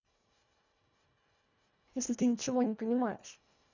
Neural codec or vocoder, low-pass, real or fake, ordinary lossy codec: codec, 24 kHz, 1.5 kbps, HILCodec; 7.2 kHz; fake; none